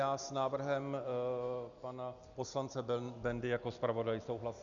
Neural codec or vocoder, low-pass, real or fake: none; 7.2 kHz; real